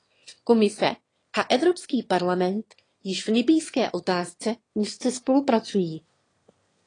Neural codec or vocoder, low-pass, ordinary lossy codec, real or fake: autoencoder, 22.05 kHz, a latent of 192 numbers a frame, VITS, trained on one speaker; 9.9 kHz; AAC, 32 kbps; fake